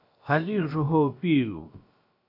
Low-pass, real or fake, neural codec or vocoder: 5.4 kHz; fake; codec, 16 kHz, about 1 kbps, DyCAST, with the encoder's durations